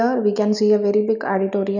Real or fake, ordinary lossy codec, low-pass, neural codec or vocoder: real; none; 7.2 kHz; none